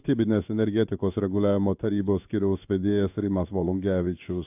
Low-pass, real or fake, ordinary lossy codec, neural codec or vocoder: 3.6 kHz; fake; AAC, 32 kbps; codec, 16 kHz in and 24 kHz out, 1 kbps, XY-Tokenizer